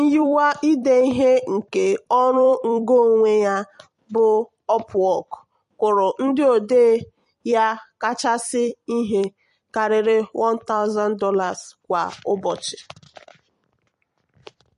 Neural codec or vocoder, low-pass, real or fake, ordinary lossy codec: vocoder, 44.1 kHz, 128 mel bands every 256 samples, BigVGAN v2; 14.4 kHz; fake; MP3, 48 kbps